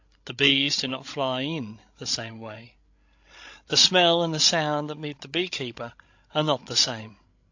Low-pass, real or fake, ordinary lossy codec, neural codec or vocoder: 7.2 kHz; fake; AAC, 48 kbps; codec, 16 kHz, 16 kbps, FreqCodec, larger model